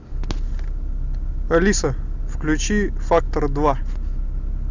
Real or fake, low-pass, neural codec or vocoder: real; 7.2 kHz; none